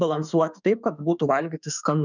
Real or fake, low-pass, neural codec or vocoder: fake; 7.2 kHz; autoencoder, 48 kHz, 32 numbers a frame, DAC-VAE, trained on Japanese speech